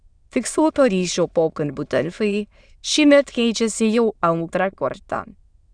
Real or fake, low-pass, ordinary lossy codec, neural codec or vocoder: fake; 9.9 kHz; Opus, 64 kbps; autoencoder, 22.05 kHz, a latent of 192 numbers a frame, VITS, trained on many speakers